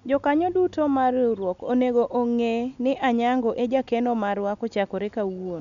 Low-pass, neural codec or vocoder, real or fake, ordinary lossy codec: 7.2 kHz; none; real; none